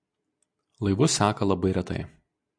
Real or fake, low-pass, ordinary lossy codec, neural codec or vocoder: real; 9.9 kHz; AAC, 64 kbps; none